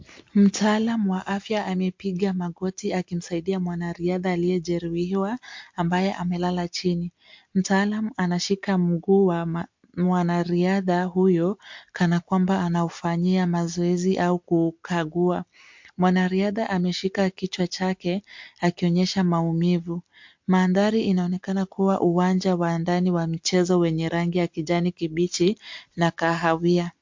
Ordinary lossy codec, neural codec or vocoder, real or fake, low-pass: MP3, 48 kbps; none; real; 7.2 kHz